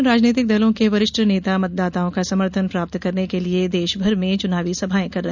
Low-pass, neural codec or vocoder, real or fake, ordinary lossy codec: 7.2 kHz; none; real; none